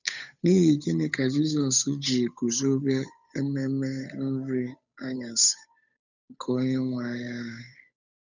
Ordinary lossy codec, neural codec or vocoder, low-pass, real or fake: none; codec, 16 kHz, 8 kbps, FunCodec, trained on Chinese and English, 25 frames a second; 7.2 kHz; fake